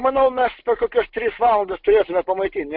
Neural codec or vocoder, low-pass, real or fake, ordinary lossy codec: none; 5.4 kHz; real; MP3, 48 kbps